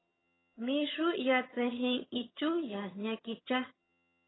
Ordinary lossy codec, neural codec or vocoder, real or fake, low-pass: AAC, 16 kbps; vocoder, 22.05 kHz, 80 mel bands, HiFi-GAN; fake; 7.2 kHz